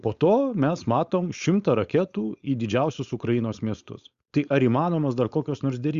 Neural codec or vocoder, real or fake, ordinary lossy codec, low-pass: codec, 16 kHz, 4.8 kbps, FACodec; fake; Opus, 64 kbps; 7.2 kHz